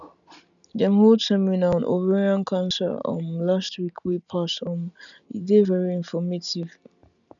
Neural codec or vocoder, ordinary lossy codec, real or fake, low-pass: none; none; real; 7.2 kHz